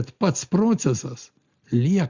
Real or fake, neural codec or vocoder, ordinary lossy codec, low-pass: real; none; Opus, 64 kbps; 7.2 kHz